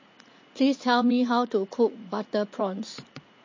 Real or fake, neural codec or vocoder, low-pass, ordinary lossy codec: fake; vocoder, 44.1 kHz, 80 mel bands, Vocos; 7.2 kHz; MP3, 32 kbps